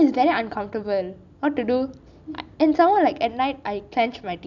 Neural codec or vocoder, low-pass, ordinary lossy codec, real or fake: none; 7.2 kHz; none; real